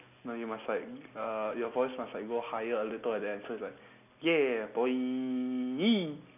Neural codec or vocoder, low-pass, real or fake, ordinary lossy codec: none; 3.6 kHz; real; none